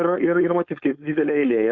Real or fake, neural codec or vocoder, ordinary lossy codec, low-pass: fake; codec, 16 kHz, 4.8 kbps, FACodec; Opus, 64 kbps; 7.2 kHz